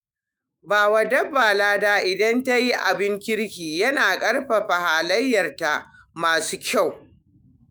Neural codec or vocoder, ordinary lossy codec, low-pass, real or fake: autoencoder, 48 kHz, 128 numbers a frame, DAC-VAE, trained on Japanese speech; none; none; fake